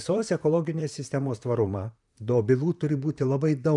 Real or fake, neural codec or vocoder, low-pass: fake; vocoder, 44.1 kHz, 128 mel bands, Pupu-Vocoder; 10.8 kHz